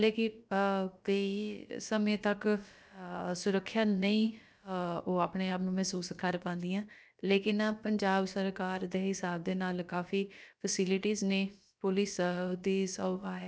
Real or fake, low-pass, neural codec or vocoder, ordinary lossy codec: fake; none; codec, 16 kHz, about 1 kbps, DyCAST, with the encoder's durations; none